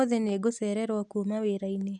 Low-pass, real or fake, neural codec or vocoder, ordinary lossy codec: 9.9 kHz; real; none; none